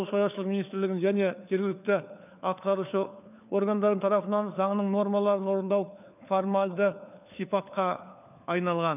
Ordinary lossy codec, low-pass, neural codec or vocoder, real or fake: none; 3.6 kHz; codec, 16 kHz, 4 kbps, FunCodec, trained on LibriTTS, 50 frames a second; fake